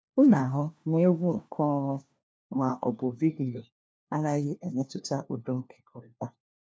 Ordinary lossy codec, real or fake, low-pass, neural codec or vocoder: none; fake; none; codec, 16 kHz, 1 kbps, FunCodec, trained on LibriTTS, 50 frames a second